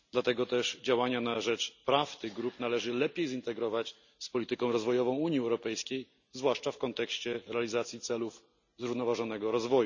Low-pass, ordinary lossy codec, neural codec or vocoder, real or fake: 7.2 kHz; none; none; real